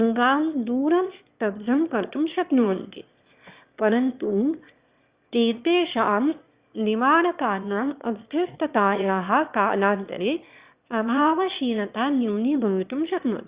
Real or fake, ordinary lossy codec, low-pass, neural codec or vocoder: fake; Opus, 64 kbps; 3.6 kHz; autoencoder, 22.05 kHz, a latent of 192 numbers a frame, VITS, trained on one speaker